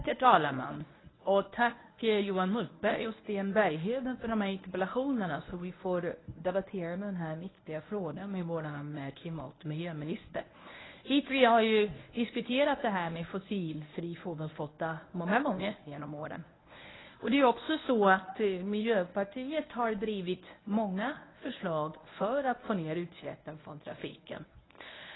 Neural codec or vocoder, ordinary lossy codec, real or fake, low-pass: codec, 24 kHz, 0.9 kbps, WavTokenizer, medium speech release version 1; AAC, 16 kbps; fake; 7.2 kHz